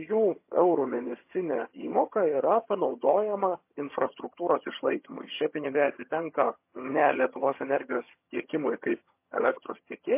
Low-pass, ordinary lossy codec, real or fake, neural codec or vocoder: 3.6 kHz; MP3, 24 kbps; fake; vocoder, 22.05 kHz, 80 mel bands, HiFi-GAN